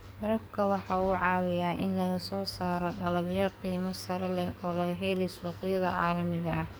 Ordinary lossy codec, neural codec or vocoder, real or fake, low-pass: none; codec, 44.1 kHz, 2.6 kbps, SNAC; fake; none